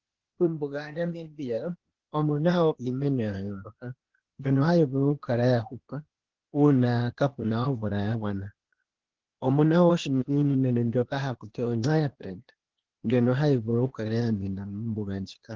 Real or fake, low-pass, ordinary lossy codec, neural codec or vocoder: fake; 7.2 kHz; Opus, 16 kbps; codec, 16 kHz, 0.8 kbps, ZipCodec